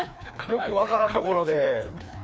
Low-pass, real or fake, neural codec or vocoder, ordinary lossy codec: none; fake; codec, 16 kHz, 2 kbps, FreqCodec, larger model; none